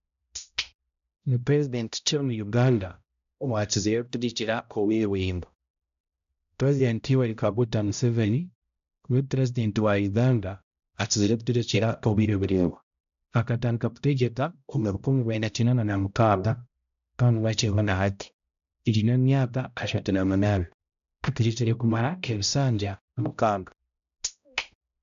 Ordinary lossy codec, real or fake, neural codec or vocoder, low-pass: AAC, 96 kbps; fake; codec, 16 kHz, 0.5 kbps, X-Codec, HuBERT features, trained on balanced general audio; 7.2 kHz